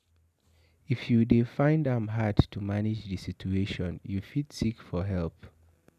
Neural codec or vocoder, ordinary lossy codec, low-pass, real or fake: none; none; 14.4 kHz; real